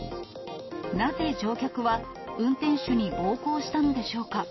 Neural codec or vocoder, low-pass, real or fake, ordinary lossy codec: none; 7.2 kHz; real; MP3, 24 kbps